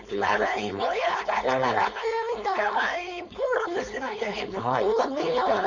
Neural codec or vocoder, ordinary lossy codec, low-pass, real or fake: codec, 16 kHz, 4.8 kbps, FACodec; none; 7.2 kHz; fake